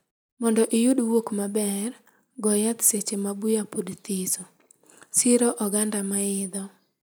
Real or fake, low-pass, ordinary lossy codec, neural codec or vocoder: real; none; none; none